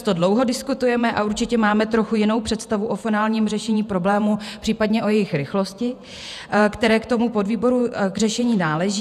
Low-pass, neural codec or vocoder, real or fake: 14.4 kHz; vocoder, 48 kHz, 128 mel bands, Vocos; fake